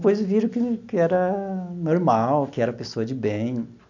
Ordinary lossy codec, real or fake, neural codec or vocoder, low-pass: none; real; none; 7.2 kHz